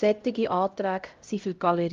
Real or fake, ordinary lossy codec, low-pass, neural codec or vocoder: fake; Opus, 32 kbps; 7.2 kHz; codec, 16 kHz, 0.5 kbps, X-Codec, HuBERT features, trained on LibriSpeech